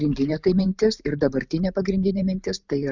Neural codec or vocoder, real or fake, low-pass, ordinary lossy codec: vocoder, 44.1 kHz, 128 mel bands every 512 samples, BigVGAN v2; fake; 7.2 kHz; Opus, 64 kbps